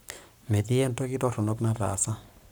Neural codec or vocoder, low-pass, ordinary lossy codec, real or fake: codec, 44.1 kHz, 7.8 kbps, Pupu-Codec; none; none; fake